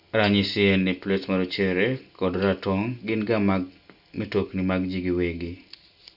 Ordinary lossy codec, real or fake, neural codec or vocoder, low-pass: none; real; none; 5.4 kHz